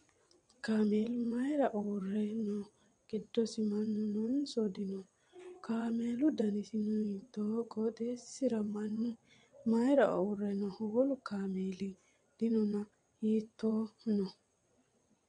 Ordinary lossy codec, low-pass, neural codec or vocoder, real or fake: MP3, 64 kbps; 9.9 kHz; vocoder, 22.05 kHz, 80 mel bands, WaveNeXt; fake